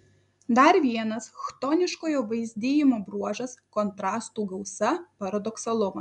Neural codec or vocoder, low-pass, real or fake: none; 10.8 kHz; real